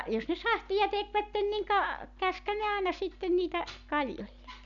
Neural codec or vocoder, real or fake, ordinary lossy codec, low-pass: none; real; MP3, 64 kbps; 7.2 kHz